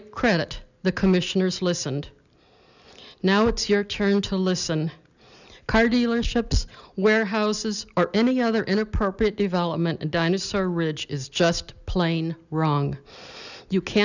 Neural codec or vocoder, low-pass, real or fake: none; 7.2 kHz; real